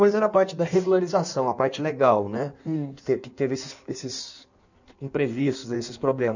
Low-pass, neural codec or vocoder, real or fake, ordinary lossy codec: 7.2 kHz; codec, 16 kHz in and 24 kHz out, 1.1 kbps, FireRedTTS-2 codec; fake; none